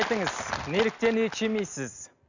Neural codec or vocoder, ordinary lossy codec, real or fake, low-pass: none; none; real; 7.2 kHz